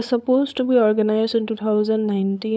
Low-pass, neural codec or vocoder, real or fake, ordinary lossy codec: none; codec, 16 kHz, 8 kbps, FunCodec, trained on LibriTTS, 25 frames a second; fake; none